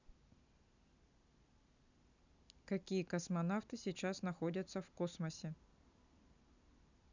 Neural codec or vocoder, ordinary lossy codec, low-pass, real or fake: none; none; 7.2 kHz; real